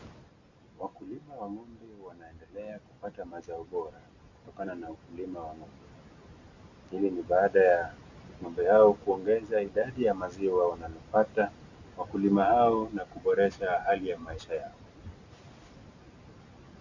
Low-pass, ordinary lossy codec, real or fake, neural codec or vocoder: 7.2 kHz; Opus, 64 kbps; real; none